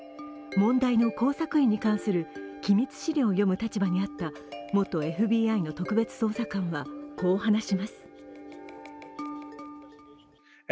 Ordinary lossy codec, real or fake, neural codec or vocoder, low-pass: none; real; none; none